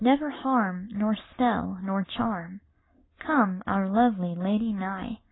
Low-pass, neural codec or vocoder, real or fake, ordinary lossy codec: 7.2 kHz; none; real; AAC, 16 kbps